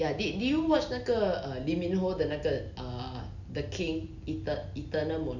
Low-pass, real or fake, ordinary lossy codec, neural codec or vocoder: 7.2 kHz; real; none; none